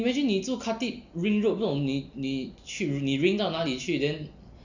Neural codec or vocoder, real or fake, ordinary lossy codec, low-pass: none; real; none; 7.2 kHz